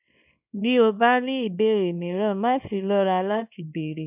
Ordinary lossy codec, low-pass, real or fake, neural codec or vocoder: none; 3.6 kHz; fake; codec, 24 kHz, 0.9 kbps, WavTokenizer, small release